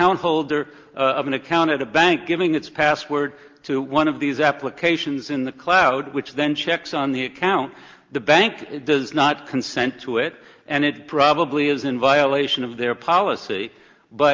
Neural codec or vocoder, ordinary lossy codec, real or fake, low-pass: none; Opus, 32 kbps; real; 7.2 kHz